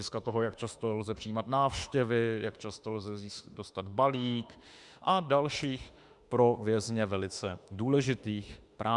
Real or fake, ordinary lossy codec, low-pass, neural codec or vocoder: fake; Opus, 64 kbps; 10.8 kHz; autoencoder, 48 kHz, 32 numbers a frame, DAC-VAE, trained on Japanese speech